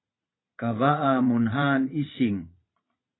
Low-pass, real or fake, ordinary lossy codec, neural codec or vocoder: 7.2 kHz; fake; AAC, 16 kbps; vocoder, 22.05 kHz, 80 mel bands, Vocos